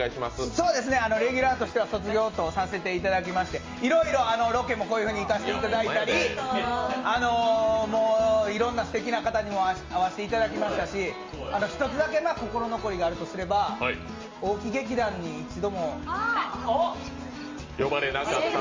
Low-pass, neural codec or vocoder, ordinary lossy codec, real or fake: 7.2 kHz; none; Opus, 32 kbps; real